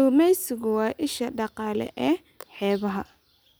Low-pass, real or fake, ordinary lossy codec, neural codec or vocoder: none; real; none; none